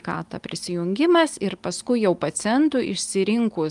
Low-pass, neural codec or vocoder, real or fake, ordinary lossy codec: 10.8 kHz; none; real; Opus, 32 kbps